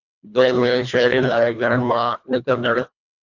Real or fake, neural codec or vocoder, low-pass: fake; codec, 24 kHz, 1.5 kbps, HILCodec; 7.2 kHz